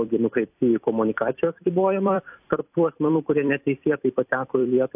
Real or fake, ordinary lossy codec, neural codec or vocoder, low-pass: fake; AAC, 32 kbps; vocoder, 44.1 kHz, 128 mel bands every 256 samples, BigVGAN v2; 3.6 kHz